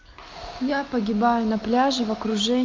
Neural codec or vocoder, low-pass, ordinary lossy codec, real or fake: none; 7.2 kHz; Opus, 24 kbps; real